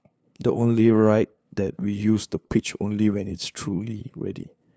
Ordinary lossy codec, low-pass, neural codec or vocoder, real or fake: none; none; codec, 16 kHz, 2 kbps, FunCodec, trained on LibriTTS, 25 frames a second; fake